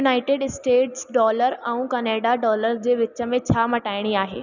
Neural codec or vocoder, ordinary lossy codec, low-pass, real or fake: none; none; 7.2 kHz; real